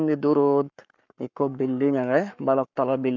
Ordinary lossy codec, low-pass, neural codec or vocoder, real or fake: none; 7.2 kHz; codec, 44.1 kHz, 7.8 kbps, Pupu-Codec; fake